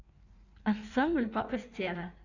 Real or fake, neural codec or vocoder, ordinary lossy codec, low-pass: fake; codec, 16 kHz in and 24 kHz out, 1.1 kbps, FireRedTTS-2 codec; none; 7.2 kHz